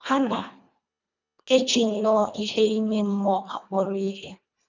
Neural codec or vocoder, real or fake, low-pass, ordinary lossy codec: codec, 24 kHz, 1.5 kbps, HILCodec; fake; 7.2 kHz; none